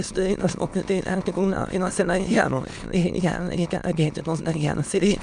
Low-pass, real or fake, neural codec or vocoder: 9.9 kHz; fake; autoencoder, 22.05 kHz, a latent of 192 numbers a frame, VITS, trained on many speakers